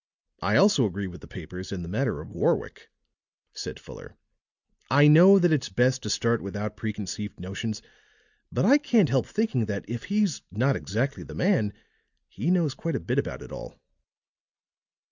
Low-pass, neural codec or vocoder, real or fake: 7.2 kHz; none; real